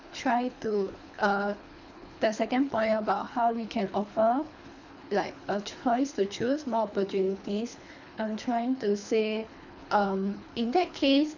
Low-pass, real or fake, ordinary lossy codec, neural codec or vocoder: 7.2 kHz; fake; none; codec, 24 kHz, 3 kbps, HILCodec